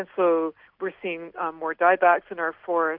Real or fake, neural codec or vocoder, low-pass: real; none; 5.4 kHz